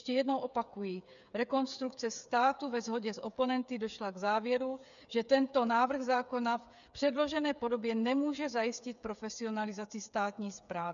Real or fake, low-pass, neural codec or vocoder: fake; 7.2 kHz; codec, 16 kHz, 8 kbps, FreqCodec, smaller model